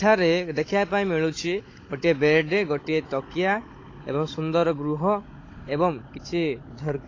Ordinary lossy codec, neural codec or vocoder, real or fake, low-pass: AAC, 32 kbps; codec, 16 kHz, 16 kbps, FunCodec, trained on Chinese and English, 50 frames a second; fake; 7.2 kHz